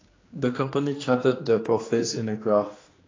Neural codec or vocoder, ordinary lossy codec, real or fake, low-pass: codec, 16 kHz, 2 kbps, X-Codec, HuBERT features, trained on general audio; AAC, 32 kbps; fake; 7.2 kHz